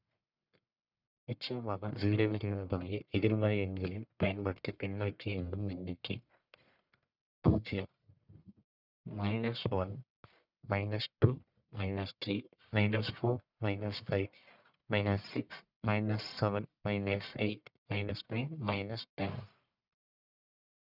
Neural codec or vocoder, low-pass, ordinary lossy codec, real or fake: codec, 44.1 kHz, 1.7 kbps, Pupu-Codec; 5.4 kHz; none; fake